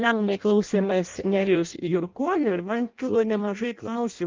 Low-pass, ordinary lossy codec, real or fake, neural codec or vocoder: 7.2 kHz; Opus, 32 kbps; fake; codec, 16 kHz in and 24 kHz out, 0.6 kbps, FireRedTTS-2 codec